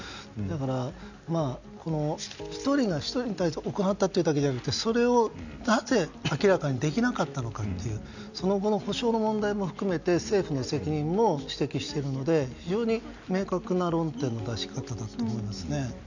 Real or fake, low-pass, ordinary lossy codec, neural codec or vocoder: real; 7.2 kHz; none; none